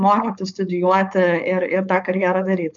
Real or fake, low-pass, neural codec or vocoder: fake; 7.2 kHz; codec, 16 kHz, 4.8 kbps, FACodec